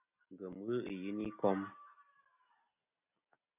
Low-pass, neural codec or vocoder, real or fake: 3.6 kHz; none; real